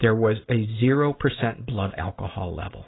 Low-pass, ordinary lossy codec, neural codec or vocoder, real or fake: 7.2 kHz; AAC, 16 kbps; none; real